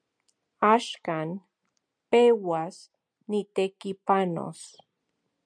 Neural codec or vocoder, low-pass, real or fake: none; 9.9 kHz; real